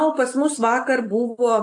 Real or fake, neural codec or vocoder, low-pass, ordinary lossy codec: real; none; 10.8 kHz; AAC, 48 kbps